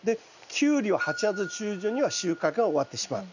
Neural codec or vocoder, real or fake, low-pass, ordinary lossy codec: none; real; 7.2 kHz; none